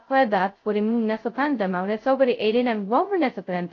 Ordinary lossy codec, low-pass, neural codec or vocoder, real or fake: AAC, 32 kbps; 7.2 kHz; codec, 16 kHz, 0.2 kbps, FocalCodec; fake